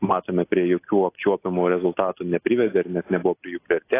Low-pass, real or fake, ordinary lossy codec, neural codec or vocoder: 3.6 kHz; real; AAC, 24 kbps; none